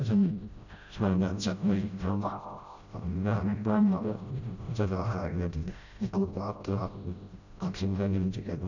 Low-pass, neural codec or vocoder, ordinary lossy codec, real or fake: 7.2 kHz; codec, 16 kHz, 0.5 kbps, FreqCodec, smaller model; none; fake